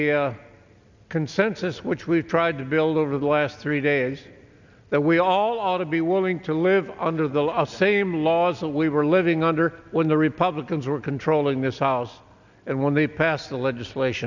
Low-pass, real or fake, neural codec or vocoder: 7.2 kHz; real; none